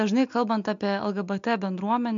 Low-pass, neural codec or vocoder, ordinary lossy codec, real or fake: 7.2 kHz; none; MP3, 64 kbps; real